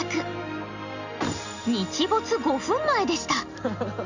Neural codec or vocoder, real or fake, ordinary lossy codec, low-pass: none; real; Opus, 64 kbps; 7.2 kHz